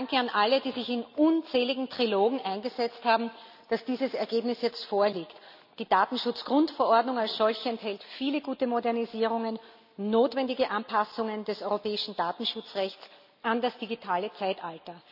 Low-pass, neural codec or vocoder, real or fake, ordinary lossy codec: 5.4 kHz; none; real; none